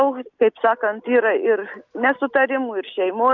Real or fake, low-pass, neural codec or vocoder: real; 7.2 kHz; none